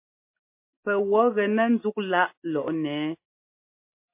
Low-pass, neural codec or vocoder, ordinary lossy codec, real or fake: 3.6 kHz; none; MP3, 24 kbps; real